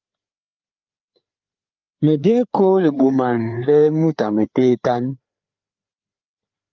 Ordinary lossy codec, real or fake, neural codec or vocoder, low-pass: Opus, 24 kbps; fake; codec, 16 kHz, 4 kbps, FreqCodec, larger model; 7.2 kHz